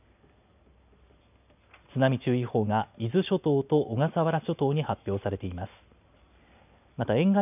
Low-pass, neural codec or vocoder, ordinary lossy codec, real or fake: 3.6 kHz; none; none; real